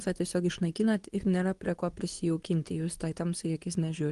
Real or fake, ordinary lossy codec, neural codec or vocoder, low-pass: fake; Opus, 24 kbps; codec, 24 kHz, 0.9 kbps, WavTokenizer, medium speech release version 2; 10.8 kHz